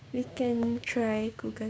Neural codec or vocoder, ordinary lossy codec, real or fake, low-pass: codec, 16 kHz, 6 kbps, DAC; none; fake; none